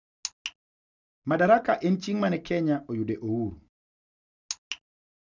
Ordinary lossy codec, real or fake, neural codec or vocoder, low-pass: none; real; none; 7.2 kHz